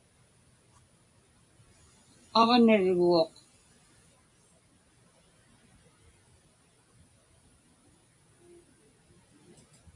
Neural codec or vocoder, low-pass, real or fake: none; 10.8 kHz; real